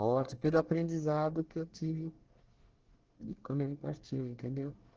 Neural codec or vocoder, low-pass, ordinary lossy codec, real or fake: codec, 24 kHz, 1 kbps, SNAC; 7.2 kHz; Opus, 16 kbps; fake